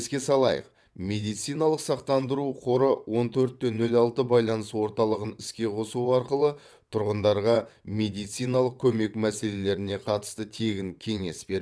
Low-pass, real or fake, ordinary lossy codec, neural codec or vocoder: none; fake; none; vocoder, 22.05 kHz, 80 mel bands, WaveNeXt